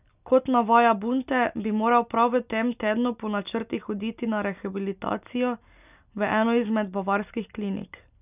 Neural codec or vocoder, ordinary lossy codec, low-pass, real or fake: none; none; 3.6 kHz; real